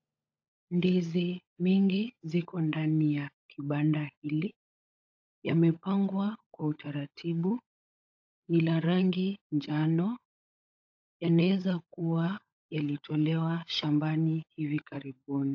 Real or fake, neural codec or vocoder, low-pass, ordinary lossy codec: fake; codec, 16 kHz, 16 kbps, FunCodec, trained on LibriTTS, 50 frames a second; 7.2 kHz; AAC, 48 kbps